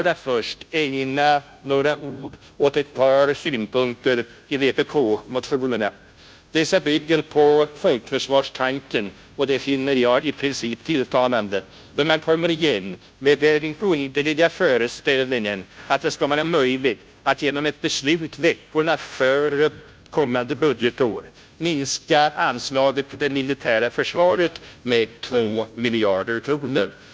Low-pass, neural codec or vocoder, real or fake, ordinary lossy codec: none; codec, 16 kHz, 0.5 kbps, FunCodec, trained on Chinese and English, 25 frames a second; fake; none